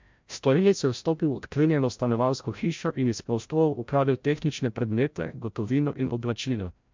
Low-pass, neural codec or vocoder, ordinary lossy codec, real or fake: 7.2 kHz; codec, 16 kHz, 0.5 kbps, FreqCodec, larger model; MP3, 64 kbps; fake